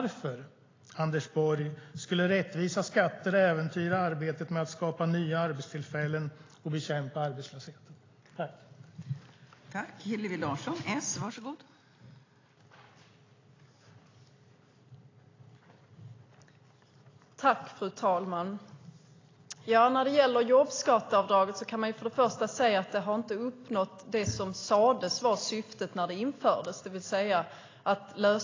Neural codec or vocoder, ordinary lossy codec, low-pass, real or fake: none; AAC, 32 kbps; 7.2 kHz; real